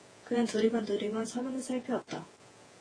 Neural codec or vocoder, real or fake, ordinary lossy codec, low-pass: vocoder, 48 kHz, 128 mel bands, Vocos; fake; AAC, 32 kbps; 9.9 kHz